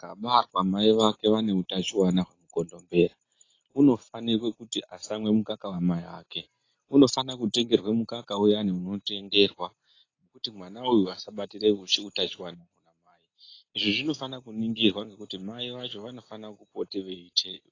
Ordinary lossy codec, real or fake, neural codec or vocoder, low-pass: AAC, 32 kbps; real; none; 7.2 kHz